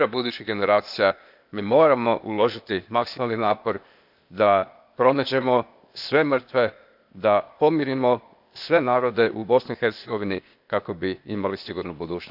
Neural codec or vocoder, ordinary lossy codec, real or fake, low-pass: codec, 16 kHz, 0.8 kbps, ZipCodec; none; fake; 5.4 kHz